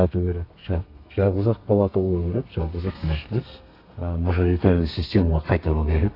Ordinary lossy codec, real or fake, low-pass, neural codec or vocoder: AAC, 32 kbps; fake; 5.4 kHz; codec, 32 kHz, 1.9 kbps, SNAC